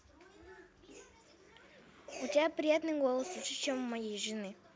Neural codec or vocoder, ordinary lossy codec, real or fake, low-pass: none; none; real; none